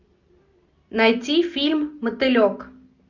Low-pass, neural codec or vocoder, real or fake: 7.2 kHz; none; real